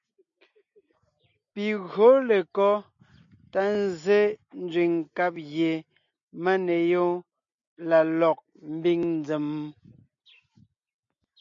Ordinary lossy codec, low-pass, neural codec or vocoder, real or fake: MP3, 48 kbps; 7.2 kHz; none; real